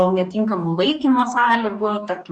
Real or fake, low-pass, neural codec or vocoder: fake; 10.8 kHz; codec, 44.1 kHz, 2.6 kbps, DAC